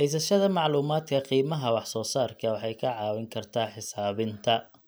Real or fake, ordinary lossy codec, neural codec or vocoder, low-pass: real; none; none; none